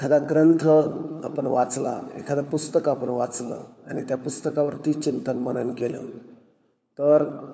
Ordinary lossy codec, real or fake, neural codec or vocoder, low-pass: none; fake; codec, 16 kHz, 4 kbps, FunCodec, trained on LibriTTS, 50 frames a second; none